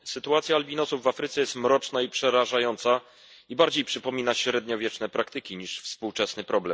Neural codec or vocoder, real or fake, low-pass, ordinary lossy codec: none; real; none; none